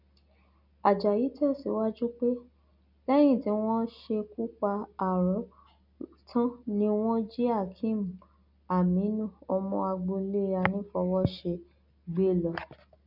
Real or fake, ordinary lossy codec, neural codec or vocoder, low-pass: real; none; none; 5.4 kHz